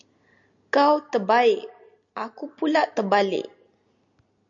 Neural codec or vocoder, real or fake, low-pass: none; real; 7.2 kHz